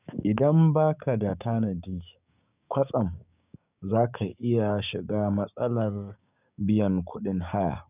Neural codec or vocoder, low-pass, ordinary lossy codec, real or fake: codec, 16 kHz, 6 kbps, DAC; 3.6 kHz; none; fake